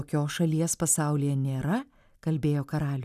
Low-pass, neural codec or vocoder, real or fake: 14.4 kHz; none; real